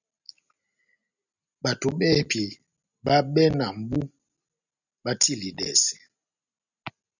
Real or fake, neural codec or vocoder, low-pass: real; none; 7.2 kHz